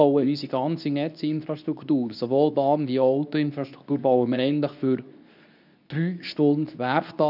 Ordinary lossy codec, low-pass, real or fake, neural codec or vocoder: none; 5.4 kHz; fake; codec, 24 kHz, 0.9 kbps, WavTokenizer, medium speech release version 2